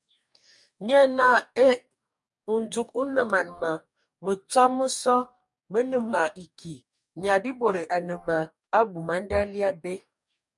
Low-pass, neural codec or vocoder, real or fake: 10.8 kHz; codec, 44.1 kHz, 2.6 kbps, DAC; fake